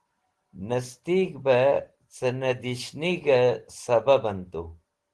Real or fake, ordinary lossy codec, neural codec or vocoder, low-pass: real; Opus, 16 kbps; none; 10.8 kHz